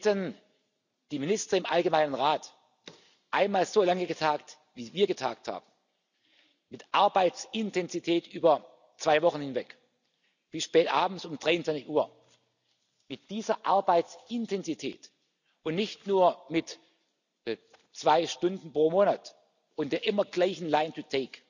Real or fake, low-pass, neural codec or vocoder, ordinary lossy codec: real; 7.2 kHz; none; none